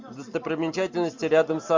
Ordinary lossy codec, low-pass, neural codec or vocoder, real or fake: MP3, 64 kbps; 7.2 kHz; none; real